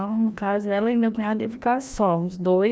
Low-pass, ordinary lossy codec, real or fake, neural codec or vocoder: none; none; fake; codec, 16 kHz, 1 kbps, FreqCodec, larger model